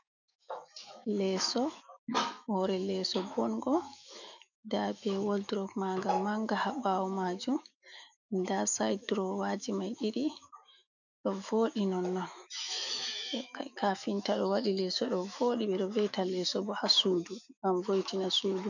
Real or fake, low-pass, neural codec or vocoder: fake; 7.2 kHz; autoencoder, 48 kHz, 128 numbers a frame, DAC-VAE, trained on Japanese speech